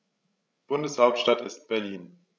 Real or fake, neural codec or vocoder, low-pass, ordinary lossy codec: fake; codec, 16 kHz, 16 kbps, FreqCodec, larger model; none; none